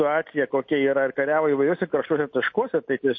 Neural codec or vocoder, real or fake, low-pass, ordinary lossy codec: none; real; 7.2 kHz; MP3, 32 kbps